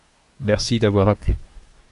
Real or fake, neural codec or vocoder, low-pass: fake; codec, 24 kHz, 1 kbps, SNAC; 10.8 kHz